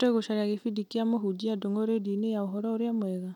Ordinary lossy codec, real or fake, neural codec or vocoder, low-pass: none; real; none; 19.8 kHz